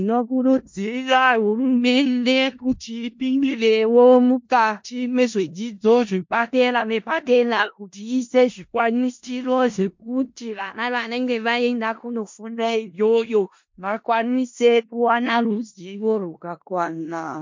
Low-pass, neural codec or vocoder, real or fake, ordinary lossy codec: 7.2 kHz; codec, 16 kHz in and 24 kHz out, 0.4 kbps, LongCat-Audio-Codec, four codebook decoder; fake; MP3, 48 kbps